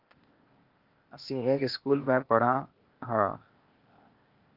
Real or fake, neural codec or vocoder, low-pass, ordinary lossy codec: fake; codec, 16 kHz, 0.8 kbps, ZipCodec; 5.4 kHz; Opus, 24 kbps